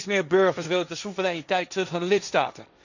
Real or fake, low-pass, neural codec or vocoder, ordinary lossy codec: fake; 7.2 kHz; codec, 16 kHz, 1.1 kbps, Voila-Tokenizer; none